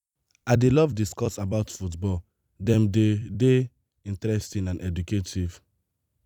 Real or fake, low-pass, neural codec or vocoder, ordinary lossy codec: fake; 19.8 kHz; vocoder, 44.1 kHz, 128 mel bands every 256 samples, BigVGAN v2; none